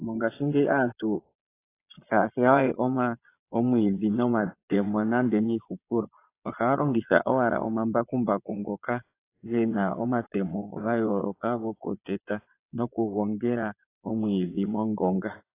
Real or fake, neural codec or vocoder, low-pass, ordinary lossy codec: fake; vocoder, 22.05 kHz, 80 mel bands, Vocos; 3.6 kHz; AAC, 24 kbps